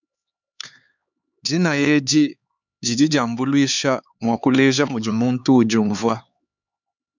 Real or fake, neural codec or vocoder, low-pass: fake; codec, 16 kHz, 4 kbps, X-Codec, HuBERT features, trained on LibriSpeech; 7.2 kHz